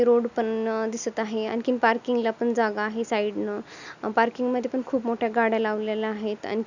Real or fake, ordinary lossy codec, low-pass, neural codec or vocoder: real; none; 7.2 kHz; none